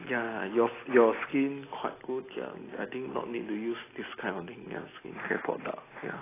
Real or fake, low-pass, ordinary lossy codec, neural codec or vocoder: real; 3.6 kHz; AAC, 16 kbps; none